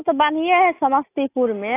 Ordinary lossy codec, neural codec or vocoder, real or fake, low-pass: AAC, 24 kbps; none; real; 3.6 kHz